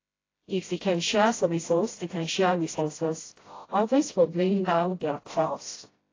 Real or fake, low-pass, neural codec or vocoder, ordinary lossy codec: fake; 7.2 kHz; codec, 16 kHz, 0.5 kbps, FreqCodec, smaller model; AAC, 32 kbps